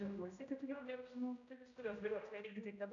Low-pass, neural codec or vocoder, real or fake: 7.2 kHz; codec, 16 kHz, 0.5 kbps, X-Codec, HuBERT features, trained on general audio; fake